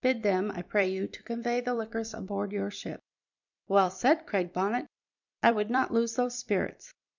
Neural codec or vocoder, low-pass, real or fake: vocoder, 44.1 kHz, 128 mel bands every 256 samples, BigVGAN v2; 7.2 kHz; fake